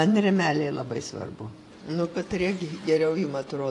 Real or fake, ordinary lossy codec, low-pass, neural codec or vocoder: fake; AAC, 48 kbps; 10.8 kHz; vocoder, 24 kHz, 100 mel bands, Vocos